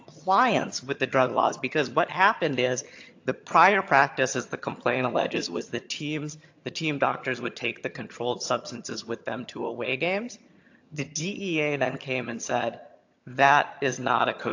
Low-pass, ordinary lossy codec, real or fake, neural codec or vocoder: 7.2 kHz; AAC, 48 kbps; fake; vocoder, 22.05 kHz, 80 mel bands, HiFi-GAN